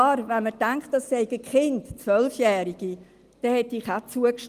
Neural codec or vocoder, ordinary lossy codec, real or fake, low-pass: none; Opus, 24 kbps; real; 14.4 kHz